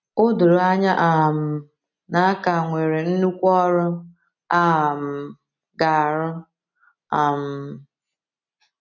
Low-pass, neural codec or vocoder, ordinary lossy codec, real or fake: 7.2 kHz; none; none; real